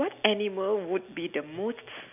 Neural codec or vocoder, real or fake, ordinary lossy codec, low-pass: none; real; none; 3.6 kHz